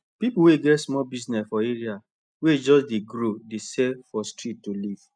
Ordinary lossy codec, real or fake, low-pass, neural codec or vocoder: none; real; 9.9 kHz; none